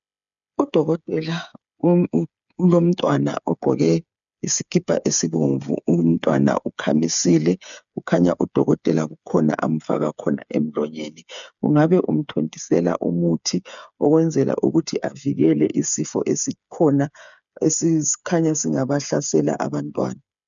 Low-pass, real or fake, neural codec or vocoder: 7.2 kHz; fake; codec, 16 kHz, 8 kbps, FreqCodec, smaller model